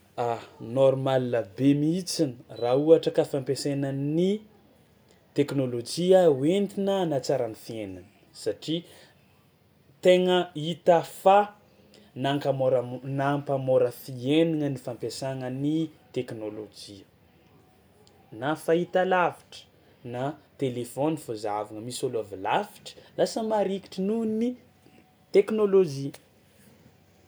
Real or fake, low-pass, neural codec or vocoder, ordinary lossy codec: real; none; none; none